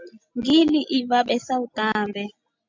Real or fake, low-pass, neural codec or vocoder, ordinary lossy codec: real; 7.2 kHz; none; MP3, 64 kbps